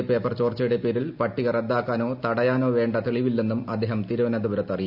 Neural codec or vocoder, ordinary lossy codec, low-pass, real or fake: none; none; 5.4 kHz; real